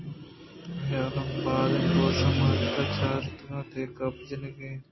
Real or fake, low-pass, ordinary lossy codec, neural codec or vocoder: real; 7.2 kHz; MP3, 24 kbps; none